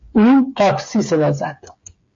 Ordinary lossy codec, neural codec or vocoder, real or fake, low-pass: MP3, 48 kbps; codec, 16 kHz, 8 kbps, FreqCodec, smaller model; fake; 7.2 kHz